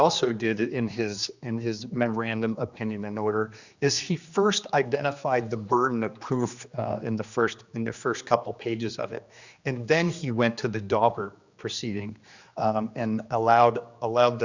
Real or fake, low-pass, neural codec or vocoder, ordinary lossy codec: fake; 7.2 kHz; codec, 16 kHz, 2 kbps, X-Codec, HuBERT features, trained on general audio; Opus, 64 kbps